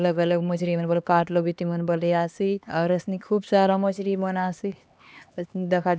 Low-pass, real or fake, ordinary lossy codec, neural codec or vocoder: none; fake; none; codec, 16 kHz, 2 kbps, X-Codec, HuBERT features, trained on LibriSpeech